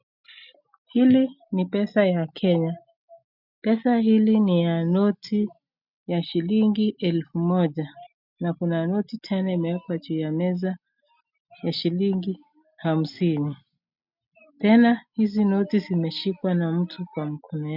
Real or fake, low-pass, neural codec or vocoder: real; 5.4 kHz; none